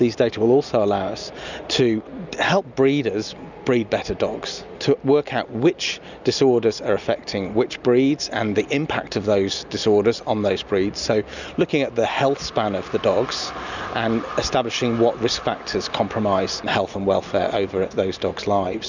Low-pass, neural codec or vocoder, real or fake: 7.2 kHz; none; real